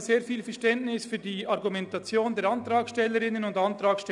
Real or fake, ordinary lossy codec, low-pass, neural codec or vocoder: real; none; 10.8 kHz; none